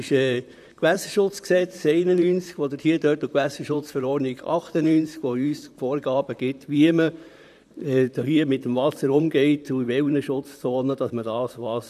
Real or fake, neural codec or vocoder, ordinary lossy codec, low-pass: fake; vocoder, 44.1 kHz, 128 mel bands, Pupu-Vocoder; none; 14.4 kHz